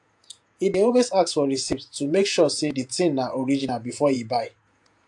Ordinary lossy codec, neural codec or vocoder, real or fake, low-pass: AAC, 64 kbps; vocoder, 48 kHz, 128 mel bands, Vocos; fake; 10.8 kHz